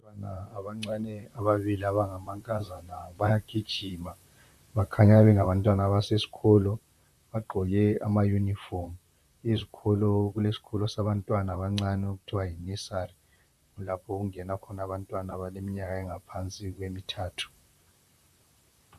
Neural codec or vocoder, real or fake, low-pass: vocoder, 44.1 kHz, 128 mel bands, Pupu-Vocoder; fake; 14.4 kHz